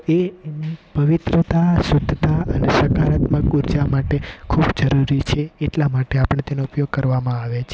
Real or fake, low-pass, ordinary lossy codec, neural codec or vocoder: real; none; none; none